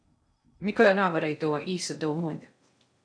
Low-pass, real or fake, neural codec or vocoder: 9.9 kHz; fake; codec, 16 kHz in and 24 kHz out, 0.6 kbps, FocalCodec, streaming, 2048 codes